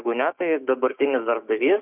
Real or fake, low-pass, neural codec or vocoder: fake; 3.6 kHz; codec, 16 kHz, 6 kbps, DAC